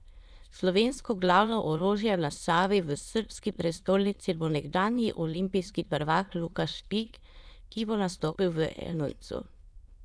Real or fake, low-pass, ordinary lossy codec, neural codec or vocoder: fake; none; none; autoencoder, 22.05 kHz, a latent of 192 numbers a frame, VITS, trained on many speakers